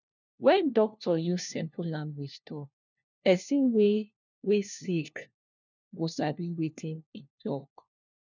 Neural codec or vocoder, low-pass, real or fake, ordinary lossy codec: codec, 16 kHz, 1 kbps, FunCodec, trained on LibriTTS, 50 frames a second; 7.2 kHz; fake; none